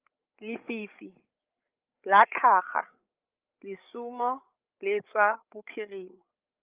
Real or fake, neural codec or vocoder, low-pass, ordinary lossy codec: fake; codec, 16 kHz, 8 kbps, FreqCodec, larger model; 3.6 kHz; Opus, 24 kbps